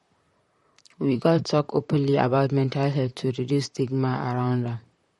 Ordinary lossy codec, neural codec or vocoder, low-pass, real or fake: MP3, 48 kbps; vocoder, 44.1 kHz, 128 mel bands, Pupu-Vocoder; 19.8 kHz; fake